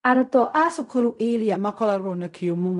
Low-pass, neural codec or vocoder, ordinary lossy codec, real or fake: 10.8 kHz; codec, 16 kHz in and 24 kHz out, 0.4 kbps, LongCat-Audio-Codec, fine tuned four codebook decoder; none; fake